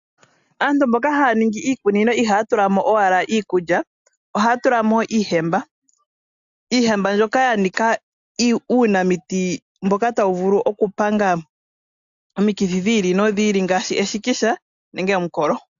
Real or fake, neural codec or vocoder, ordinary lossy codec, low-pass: real; none; AAC, 64 kbps; 7.2 kHz